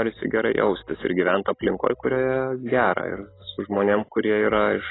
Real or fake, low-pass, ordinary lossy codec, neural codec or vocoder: real; 7.2 kHz; AAC, 16 kbps; none